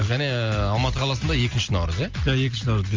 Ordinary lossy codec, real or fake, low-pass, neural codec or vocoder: Opus, 32 kbps; real; 7.2 kHz; none